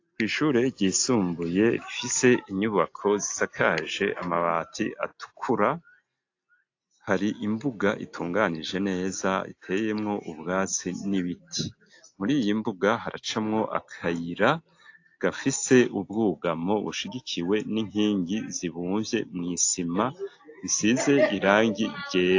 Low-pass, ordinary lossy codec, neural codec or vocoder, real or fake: 7.2 kHz; AAC, 48 kbps; none; real